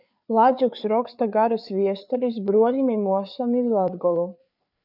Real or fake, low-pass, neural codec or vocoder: fake; 5.4 kHz; codec, 16 kHz, 4 kbps, FreqCodec, larger model